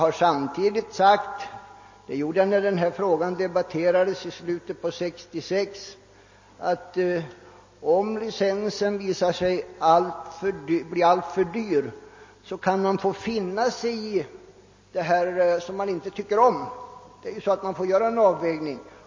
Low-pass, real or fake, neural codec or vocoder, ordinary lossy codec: 7.2 kHz; real; none; MP3, 32 kbps